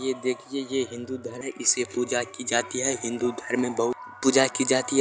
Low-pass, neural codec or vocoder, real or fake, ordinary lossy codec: none; none; real; none